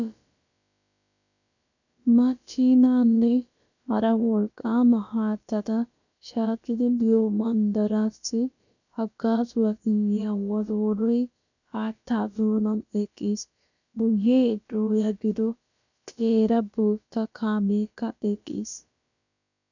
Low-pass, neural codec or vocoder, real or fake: 7.2 kHz; codec, 16 kHz, about 1 kbps, DyCAST, with the encoder's durations; fake